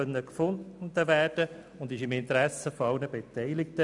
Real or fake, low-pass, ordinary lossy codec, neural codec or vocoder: real; 10.8 kHz; none; none